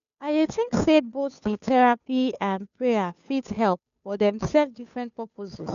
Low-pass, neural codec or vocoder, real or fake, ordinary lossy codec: 7.2 kHz; codec, 16 kHz, 2 kbps, FunCodec, trained on Chinese and English, 25 frames a second; fake; none